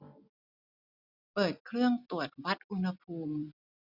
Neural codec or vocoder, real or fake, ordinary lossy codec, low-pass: none; real; none; 5.4 kHz